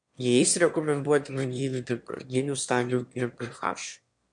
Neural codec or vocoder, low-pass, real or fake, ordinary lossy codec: autoencoder, 22.05 kHz, a latent of 192 numbers a frame, VITS, trained on one speaker; 9.9 kHz; fake; MP3, 64 kbps